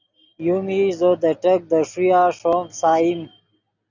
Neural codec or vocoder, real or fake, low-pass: none; real; 7.2 kHz